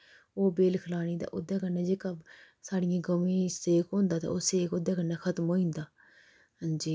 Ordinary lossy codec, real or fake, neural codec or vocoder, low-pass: none; real; none; none